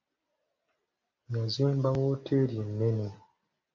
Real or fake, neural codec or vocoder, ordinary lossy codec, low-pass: real; none; AAC, 48 kbps; 7.2 kHz